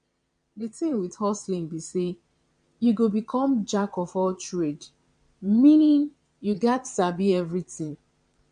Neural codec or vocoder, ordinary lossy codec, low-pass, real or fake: vocoder, 22.05 kHz, 80 mel bands, Vocos; MP3, 64 kbps; 9.9 kHz; fake